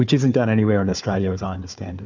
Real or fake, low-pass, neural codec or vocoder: fake; 7.2 kHz; codec, 16 kHz, 4 kbps, FunCodec, trained on Chinese and English, 50 frames a second